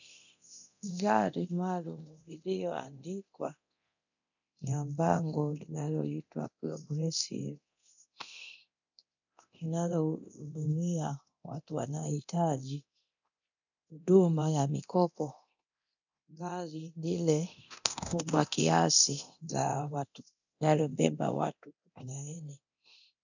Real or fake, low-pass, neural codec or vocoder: fake; 7.2 kHz; codec, 24 kHz, 0.9 kbps, DualCodec